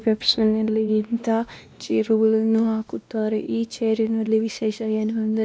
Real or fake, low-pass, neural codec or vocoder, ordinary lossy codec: fake; none; codec, 16 kHz, 1 kbps, X-Codec, WavLM features, trained on Multilingual LibriSpeech; none